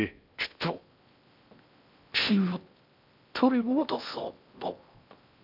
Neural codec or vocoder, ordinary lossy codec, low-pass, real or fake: codec, 16 kHz in and 24 kHz out, 0.6 kbps, FocalCodec, streaming, 2048 codes; none; 5.4 kHz; fake